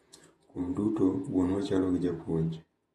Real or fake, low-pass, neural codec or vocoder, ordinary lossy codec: fake; 19.8 kHz; vocoder, 48 kHz, 128 mel bands, Vocos; AAC, 32 kbps